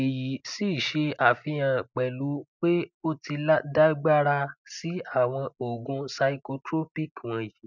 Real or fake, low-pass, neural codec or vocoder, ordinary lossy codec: real; 7.2 kHz; none; none